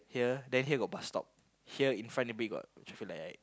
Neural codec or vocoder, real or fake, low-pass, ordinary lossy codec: none; real; none; none